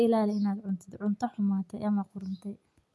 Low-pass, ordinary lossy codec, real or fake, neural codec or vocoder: none; none; real; none